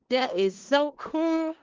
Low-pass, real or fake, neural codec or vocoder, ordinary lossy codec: 7.2 kHz; fake; codec, 16 kHz in and 24 kHz out, 0.4 kbps, LongCat-Audio-Codec, four codebook decoder; Opus, 16 kbps